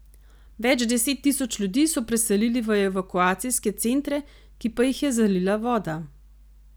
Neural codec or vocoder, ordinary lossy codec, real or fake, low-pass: none; none; real; none